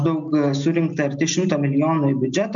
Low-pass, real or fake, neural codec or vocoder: 7.2 kHz; real; none